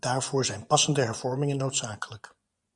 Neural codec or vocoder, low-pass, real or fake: vocoder, 24 kHz, 100 mel bands, Vocos; 10.8 kHz; fake